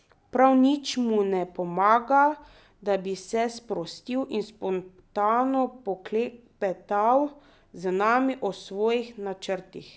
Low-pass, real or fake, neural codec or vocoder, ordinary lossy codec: none; real; none; none